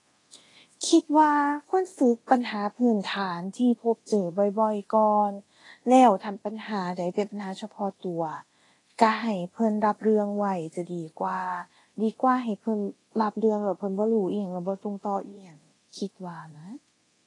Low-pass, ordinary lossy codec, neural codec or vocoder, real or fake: 10.8 kHz; AAC, 32 kbps; codec, 24 kHz, 0.9 kbps, DualCodec; fake